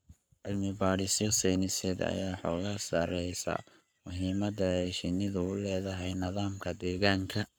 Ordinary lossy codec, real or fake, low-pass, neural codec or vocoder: none; fake; none; codec, 44.1 kHz, 7.8 kbps, Pupu-Codec